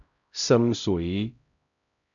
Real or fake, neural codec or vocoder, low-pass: fake; codec, 16 kHz, 0.5 kbps, X-Codec, HuBERT features, trained on LibriSpeech; 7.2 kHz